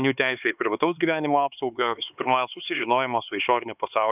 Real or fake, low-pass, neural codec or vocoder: fake; 3.6 kHz; codec, 16 kHz, 4 kbps, X-Codec, HuBERT features, trained on LibriSpeech